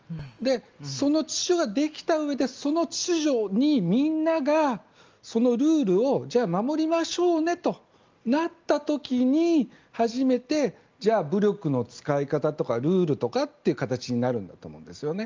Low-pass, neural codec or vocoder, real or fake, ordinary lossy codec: 7.2 kHz; none; real; Opus, 24 kbps